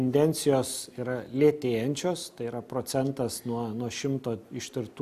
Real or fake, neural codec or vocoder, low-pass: real; none; 14.4 kHz